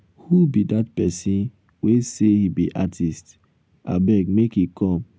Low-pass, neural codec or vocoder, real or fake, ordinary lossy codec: none; none; real; none